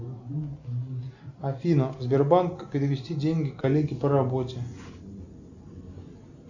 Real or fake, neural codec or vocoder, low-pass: real; none; 7.2 kHz